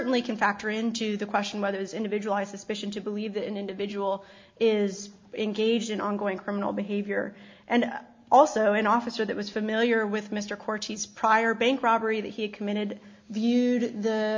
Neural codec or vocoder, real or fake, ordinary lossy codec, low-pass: none; real; MP3, 48 kbps; 7.2 kHz